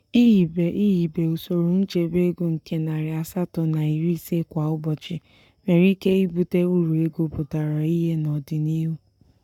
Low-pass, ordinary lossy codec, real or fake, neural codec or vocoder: 19.8 kHz; none; fake; codec, 44.1 kHz, 7.8 kbps, Pupu-Codec